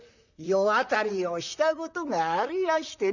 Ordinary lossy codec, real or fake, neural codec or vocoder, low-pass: none; fake; vocoder, 44.1 kHz, 128 mel bands, Pupu-Vocoder; 7.2 kHz